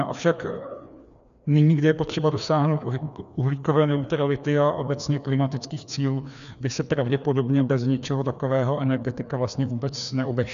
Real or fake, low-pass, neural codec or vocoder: fake; 7.2 kHz; codec, 16 kHz, 2 kbps, FreqCodec, larger model